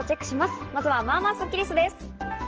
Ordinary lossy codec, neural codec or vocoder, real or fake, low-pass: Opus, 16 kbps; none; real; 7.2 kHz